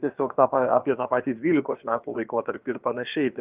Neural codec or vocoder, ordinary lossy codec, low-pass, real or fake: codec, 16 kHz, about 1 kbps, DyCAST, with the encoder's durations; Opus, 24 kbps; 3.6 kHz; fake